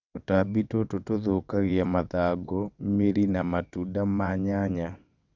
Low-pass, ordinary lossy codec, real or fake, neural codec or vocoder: 7.2 kHz; none; fake; vocoder, 22.05 kHz, 80 mel bands, WaveNeXt